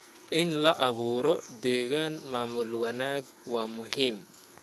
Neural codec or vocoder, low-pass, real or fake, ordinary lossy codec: codec, 44.1 kHz, 2.6 kbps, SNAC; 14.4 kHz; fake; none